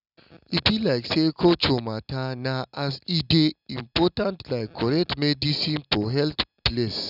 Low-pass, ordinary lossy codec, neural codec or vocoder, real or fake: 5.4 kHz; none; none; real